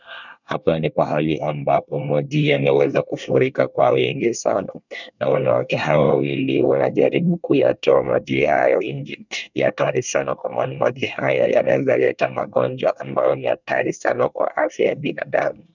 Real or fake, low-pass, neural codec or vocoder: fake; 7.2 kHz; codec, 24 kHz, 1 kbps, SNAC